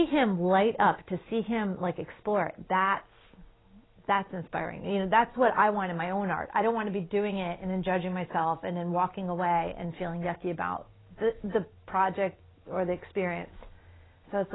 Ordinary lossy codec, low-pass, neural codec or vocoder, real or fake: AAC, 16 kbps; 7.2 kHz; none; real